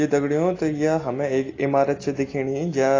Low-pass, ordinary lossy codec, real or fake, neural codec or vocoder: 7.2 kHz; AAC, 32 kbps; real; none